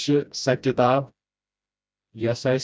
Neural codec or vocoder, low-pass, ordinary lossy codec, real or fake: codec, 16 kHz, 1 kbps, FreqCodec, smaller model; none; none; fake